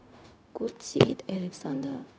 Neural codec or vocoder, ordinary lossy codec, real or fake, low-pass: codec, 16 kHz, 0.4 kbps, LongCat-Audio-Codec; none; fake; none